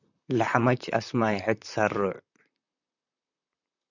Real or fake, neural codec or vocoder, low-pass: fake; vocoder, 44.1 kHz, 128 mel bands, Pupu-Vocoder; 7.2 kHz